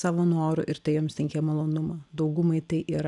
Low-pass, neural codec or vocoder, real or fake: 10.8 kHz; none; real